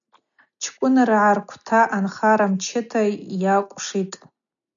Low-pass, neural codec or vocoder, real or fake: 7.2 kHz; none; real